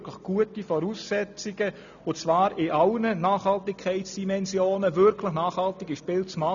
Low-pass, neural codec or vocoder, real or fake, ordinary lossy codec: 7.2 kHz; none; real; none